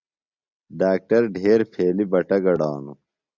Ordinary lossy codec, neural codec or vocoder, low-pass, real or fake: Opus, 64 kbps; none; 7.2 kHz; real